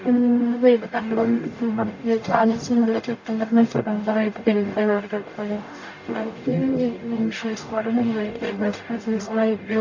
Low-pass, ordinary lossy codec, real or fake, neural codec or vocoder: 7.2 kHz; AAC, 48 kbps; fake; codec, 44.1 kHz, 0.9 kbps, DAC